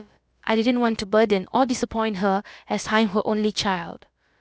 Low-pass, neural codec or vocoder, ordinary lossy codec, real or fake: none; codec, 16 kHz, about 1 kbps, DyCAST, with the encoder's durations; none; fake